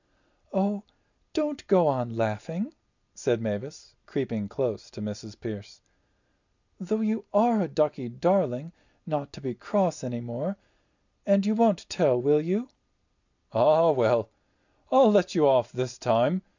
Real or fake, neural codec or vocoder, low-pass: real; none; 7.2 kHz